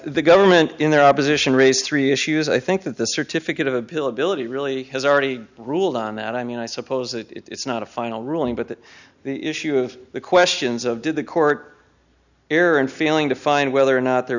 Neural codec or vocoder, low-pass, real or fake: none; 7.2 kHz; real